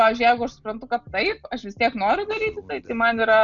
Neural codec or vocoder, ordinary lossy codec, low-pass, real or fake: none; MP3, 96 kbps; 7.2 kHz; real